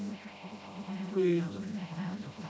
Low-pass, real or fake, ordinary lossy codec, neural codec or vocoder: none; fake; none; codec, 16 kHz, 0.5 kbps, FreqCodec, smaller model